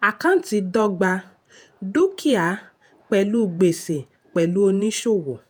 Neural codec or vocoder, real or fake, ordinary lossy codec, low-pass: vocoder, 48 kHz, 128 mel bands, Vocos; fake; none; none